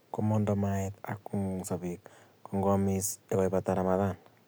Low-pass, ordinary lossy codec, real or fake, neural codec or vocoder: none; none; real; none